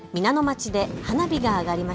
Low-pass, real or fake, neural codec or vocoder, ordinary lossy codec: none; real; none; none